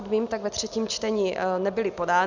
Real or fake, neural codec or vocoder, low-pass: real; none; 7.2 kHz